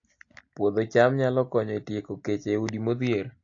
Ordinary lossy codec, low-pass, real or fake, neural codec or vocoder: AAC, 48 kbps; 7.2 kHz; real; none